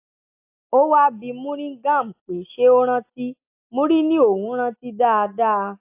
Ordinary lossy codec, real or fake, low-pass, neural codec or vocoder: AAC, 32 kbps; real; 3.6 kHz; none